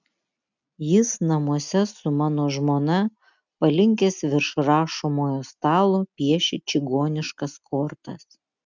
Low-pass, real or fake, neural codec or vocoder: 7.2 kHz; real; none